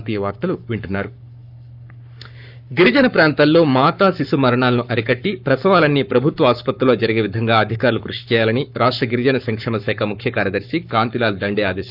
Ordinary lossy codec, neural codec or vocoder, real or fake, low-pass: none; codec, 44.1 kHz, 7.8 kbps, Pupu-Codec; fake; 5.4 kHz